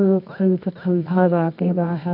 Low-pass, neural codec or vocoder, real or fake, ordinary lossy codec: 5.4 kHz; codec, 24 kHz, 0.9 kbps, WavTokenizer, medium music audio release; fake; none